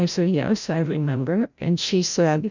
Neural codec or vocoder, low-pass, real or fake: codec, 16 kHz, 0.5 kbps, FreqCodec, larger model; 7.2 kHz; fake